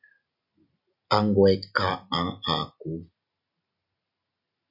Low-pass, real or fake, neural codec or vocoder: 5.4 kHz; real; none